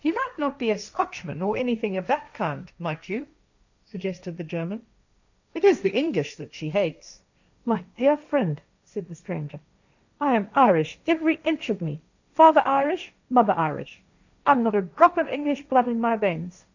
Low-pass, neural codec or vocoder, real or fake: 7.2 kHz; codec, 16 kHz, 1.1 kbps, Voila-Tokenizer; fake